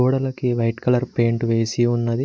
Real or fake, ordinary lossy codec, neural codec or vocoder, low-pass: real; none; none; none